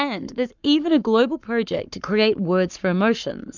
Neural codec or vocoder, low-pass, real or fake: codec, 44.1 kHz, 7.8 kbps, Pupu-Codec; 7.2 kHz; fake